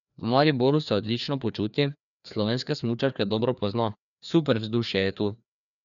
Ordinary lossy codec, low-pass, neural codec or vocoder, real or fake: none; 7.2 kHz; codec, 16 kHz, 2 kbps, FreqCodec, larger model; fake